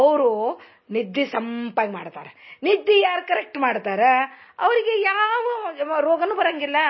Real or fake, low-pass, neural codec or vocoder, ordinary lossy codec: real; 7.2 kHz; none; MP3, 24 kbps